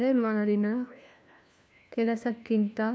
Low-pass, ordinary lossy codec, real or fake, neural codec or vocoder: none; none; fake; codec, 16 kHz, 1 kbps, FunCodec, trained on LibriTTS, 50 frames a second